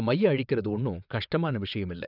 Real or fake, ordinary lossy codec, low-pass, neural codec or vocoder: fake; none; 5.4 kHz; vocoder, 22.05 kHz, 80 mel bands, WaveNeXt